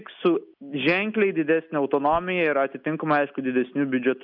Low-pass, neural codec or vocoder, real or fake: 5.4 kHz; none; real